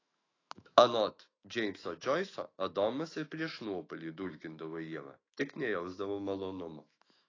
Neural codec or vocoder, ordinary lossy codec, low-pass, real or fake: autoencoder, 48 kHz, 128 numbers a frame, DAC-VAE, trained on Japanese speech; AAC, 32 kbps; 7.2 kHz; fake